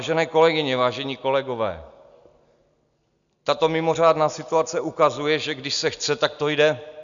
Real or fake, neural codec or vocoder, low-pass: real; none; 7.2 kHz